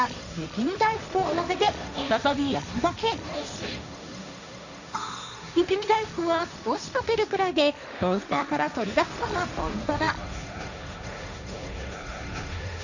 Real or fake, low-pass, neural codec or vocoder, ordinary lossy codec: fake; 7.2 kHz; codec, 16 kHz, 1.1 kbps, Voila-Tokenizer; none